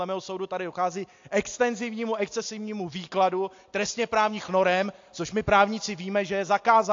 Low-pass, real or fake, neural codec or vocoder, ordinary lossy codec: 7.2 kHz; real; none; AAC, 48 kbps